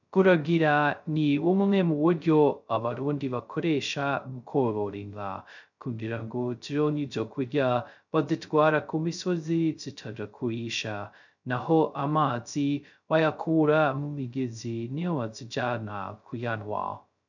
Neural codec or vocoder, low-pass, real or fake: codec, 16 kHz, 0.2 kbps, FocalCodec; 7.2 kHz; fake